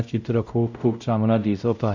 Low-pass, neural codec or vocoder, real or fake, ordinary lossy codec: 7.2 kHz; codec, 16 kHz, 0.5 kbps, X-Codec, WavLM features, trained on Multilingual LibriSpeech; fake; none